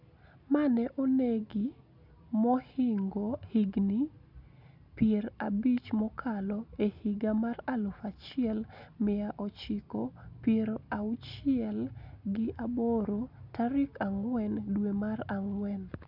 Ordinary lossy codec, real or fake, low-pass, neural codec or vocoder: none; real; 5.4 kHz; none